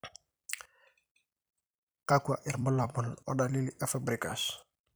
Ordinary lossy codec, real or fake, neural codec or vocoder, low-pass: none; fake; vocoder, 44.1 kHz, 128 mel bands every 256 samples, BigVGAN v2; none